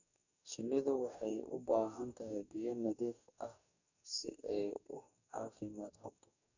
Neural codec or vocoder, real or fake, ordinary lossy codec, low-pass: codec, 44.1 kHz, 2.6 kbps, DAC; fake; none; 7.2 kHz